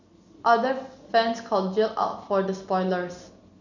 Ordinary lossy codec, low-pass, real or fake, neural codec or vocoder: none; 7.2 kHz; real; none